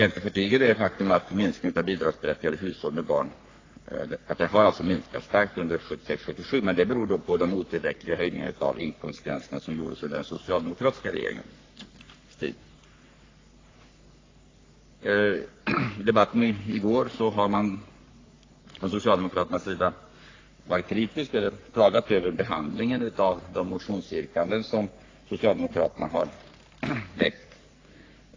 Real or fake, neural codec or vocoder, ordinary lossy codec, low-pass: fake; codec, 44.1 kHz, 3.4 kbps, Pupu-Codec; AAC, 32 kbps; 7.2 kHz